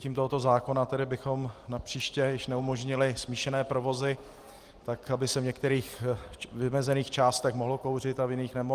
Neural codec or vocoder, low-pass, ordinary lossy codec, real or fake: none; 14.4 kHz; Opus, 24 kbps; real